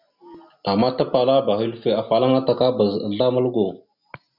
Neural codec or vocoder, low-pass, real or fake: none; 5.4 kHz; real